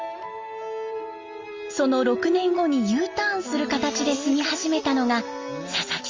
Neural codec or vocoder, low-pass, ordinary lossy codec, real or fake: none; 7.2 kHz; Opus, 32 kbps; real